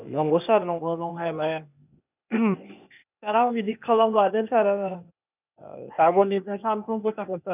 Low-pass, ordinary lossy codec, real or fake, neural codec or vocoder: 3.6 kHz; none; fake; codec, 16 kHz, 0.8 kbps, ZipCodec